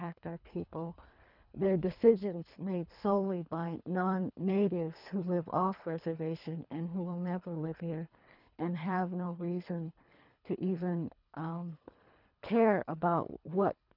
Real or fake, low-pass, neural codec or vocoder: fake; 5.4 kHz; codec, 24 kHz, 3 kbps, HILCodec